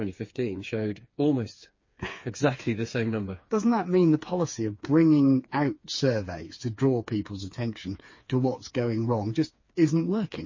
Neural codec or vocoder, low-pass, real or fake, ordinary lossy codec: codec, 16 kHz, 4 kbps, FreqCodec, smaller model; 7.2 kHz; fake; MP3, 32 kbps